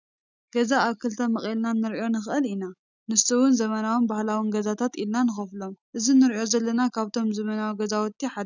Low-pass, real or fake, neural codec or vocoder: 7.2 kHz; real; none